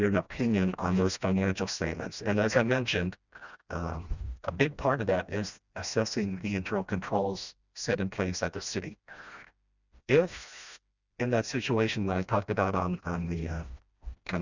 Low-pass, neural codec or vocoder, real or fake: 7.2 kHz; codec, 16 kHz, 1 kbps, FreqCodec, smaller model; fake